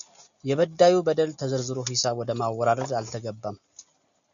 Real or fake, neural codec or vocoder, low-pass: real; none; 7.2 kHz